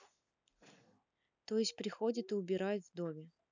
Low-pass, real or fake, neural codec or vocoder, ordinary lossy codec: 7.2 kHz; real; none; none